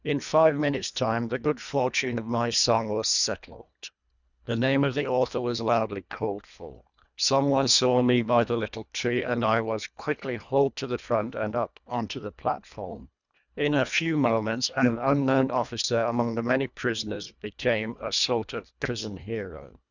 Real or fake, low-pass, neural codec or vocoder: fake; 7.2 kHz; codec, 24 kHz, 1.5 kbps, HILCodec